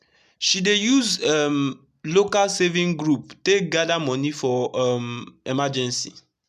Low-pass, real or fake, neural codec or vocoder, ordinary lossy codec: 14.4 kHz; real; none; none